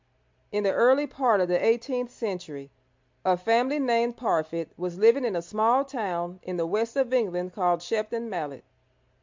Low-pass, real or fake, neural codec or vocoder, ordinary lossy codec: 7.2 kHz; real; none; MP3, 64 kbps